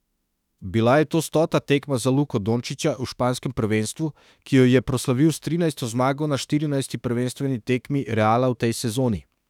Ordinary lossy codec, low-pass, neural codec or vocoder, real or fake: none; 19.8 kHz; autoencoder, 48 kHz, 32 numbers a frame, DAC-VAE, trained on Japanese speech; fake